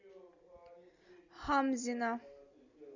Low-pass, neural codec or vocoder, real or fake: 7.2 kHz; none; real